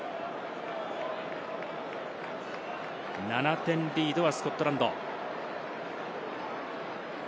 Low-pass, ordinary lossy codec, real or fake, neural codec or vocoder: none; none; real; none